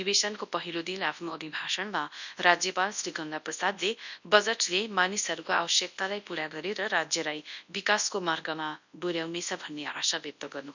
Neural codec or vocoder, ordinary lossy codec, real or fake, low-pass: codec, 24 kHz, 0.9 kbps, WavTokenizer, large speech release; none; fake; 7.2 kHz